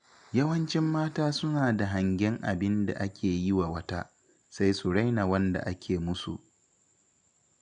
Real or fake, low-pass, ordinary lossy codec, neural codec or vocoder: real; 9.9 kHz; none; none